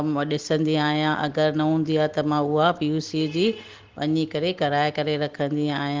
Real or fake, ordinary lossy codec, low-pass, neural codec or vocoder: real; Opus, 16 kbps; 7.2 kHz; none